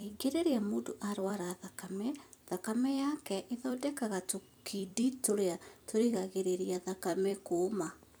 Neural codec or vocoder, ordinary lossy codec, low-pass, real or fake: vocoder, 44.1 kHz, 128 mel bands every 256 samples, BigVGAN v2; none; none; fake